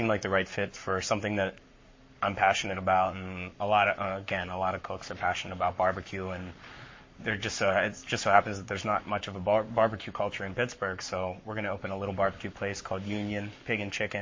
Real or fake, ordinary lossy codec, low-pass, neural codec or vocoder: fake; MP3, 32 kbps; 7.2 kHz; codec, 44.1 kHz, 7.8 kbps, Pupu-Codec